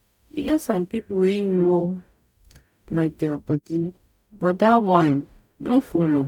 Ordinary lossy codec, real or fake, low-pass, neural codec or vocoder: none; fake; 19.8 kHz; codec, 44.1 kHz, 0.9 kbps, DAC